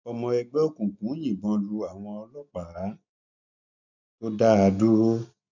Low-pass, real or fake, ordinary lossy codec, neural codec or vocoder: 7.2 kHz; real; none; none